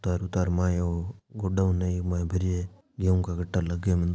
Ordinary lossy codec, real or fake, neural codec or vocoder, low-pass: none; real; none; none